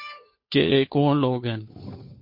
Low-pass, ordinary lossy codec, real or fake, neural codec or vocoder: 5.4 kHz; MP3, 32 kbps; fake; codec, 16 kHz, 2 kbps, FunCodec, trained on Chinese and English, 25 frames a second